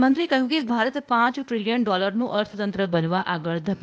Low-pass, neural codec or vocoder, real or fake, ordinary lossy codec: none; codec, 16 kHz, 0.8 kbps, ZipCodec; fake; none